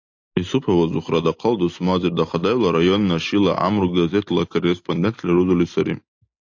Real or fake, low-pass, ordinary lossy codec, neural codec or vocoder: real; 7.2 kHz; AAC, 48 kbps; none